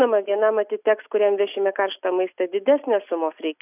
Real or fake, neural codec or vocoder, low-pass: real; none; 3.6 kHz